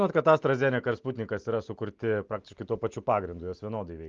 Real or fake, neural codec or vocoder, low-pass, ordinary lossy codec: real; none; 7.2 kHz; Opus, 32 kbps